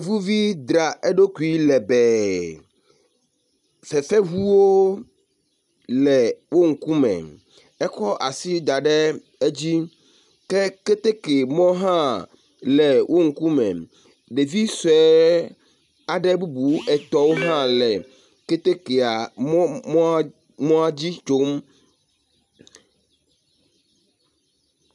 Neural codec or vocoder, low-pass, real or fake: none; 10.8 kHz; real